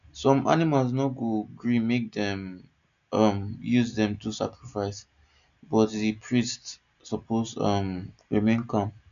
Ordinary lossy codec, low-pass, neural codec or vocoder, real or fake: none; 7.2 kHz; none; real